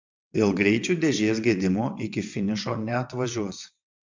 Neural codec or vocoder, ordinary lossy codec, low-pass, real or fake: vocoder, 22.05 kHz, 80 mel bands, WaveNeXt; MP3, 64 kbps; 7.2 kHz; fake